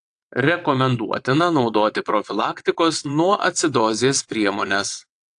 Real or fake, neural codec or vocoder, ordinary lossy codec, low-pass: fake; vocoder, 22.05 kHz, 80 mel bands, WaveNeXt; AAC, 64 kbps; 9.9 kHz